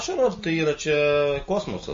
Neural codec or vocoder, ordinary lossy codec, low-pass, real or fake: none; MP3, 32 kbps; 7.2 kHz; real